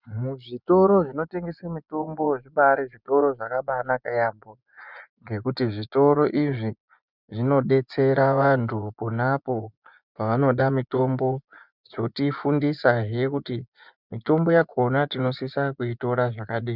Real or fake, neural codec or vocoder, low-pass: fake; vocoder, 24 kHz, 100 mel bands, Vocos; 5.4 kHz